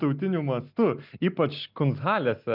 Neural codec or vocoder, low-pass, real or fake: none; 5.4 kHz; real